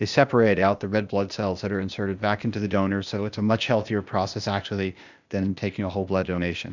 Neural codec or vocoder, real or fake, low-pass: codec, 16 kHz, 0.8 kbps, ZipCodec; fake; 7.2 kHz